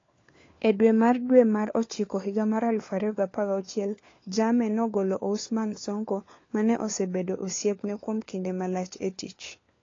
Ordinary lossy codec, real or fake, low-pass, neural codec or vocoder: AAC, 32 kbps; fake; 7.2 kHz; codec, 16 kHz, 4 kbps, FunCodec, trained on LibriTTS, 50 frames a second